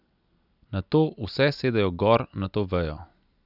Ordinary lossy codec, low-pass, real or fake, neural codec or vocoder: none; 5.4 kHz; real; none